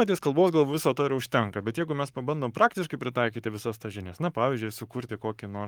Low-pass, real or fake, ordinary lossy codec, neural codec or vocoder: 19.8 kHz; fake; Opus, 32 kbps; codec, 44.1 kHz, 7.8 kbps, Pupu-Codec